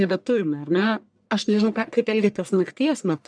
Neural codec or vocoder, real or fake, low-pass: codec, 44.1 kHz, 1.7 kbps, Pupu-Codec; fake; 9.9 kHz